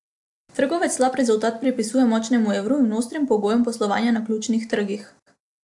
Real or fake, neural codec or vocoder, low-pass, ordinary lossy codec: real; none; 10.8 kHz; AAC, 64 kbps